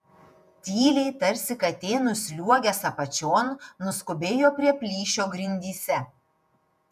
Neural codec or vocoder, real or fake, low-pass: vocoder, 48 kHz, 128 mel bands, Vocos; fake; 14.4 kHz